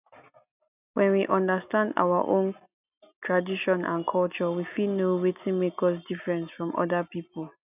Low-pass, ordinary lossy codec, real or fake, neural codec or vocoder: 3.6 kHz; none; real; none